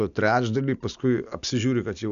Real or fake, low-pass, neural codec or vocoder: real; 7.2 kHz; none